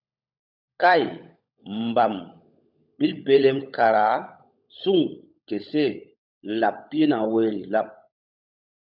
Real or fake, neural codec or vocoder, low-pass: fake; codec, 16 kHz, 16 kbps, FunCodec, trained on LibriTTS, 50 frames a second; 5.4 kHz